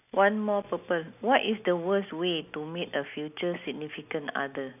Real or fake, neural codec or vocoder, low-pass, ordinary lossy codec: real; none; 3.6 kHz; none